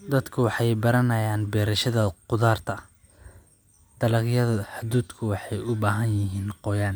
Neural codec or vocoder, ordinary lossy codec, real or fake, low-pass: none; none; real; none